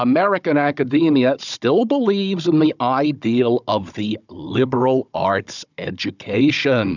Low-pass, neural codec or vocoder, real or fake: 7.2 kHz; codec, 16 kHz, 16 kbps, FunCodec, trained on Chinese and English, 50 frames a second; fake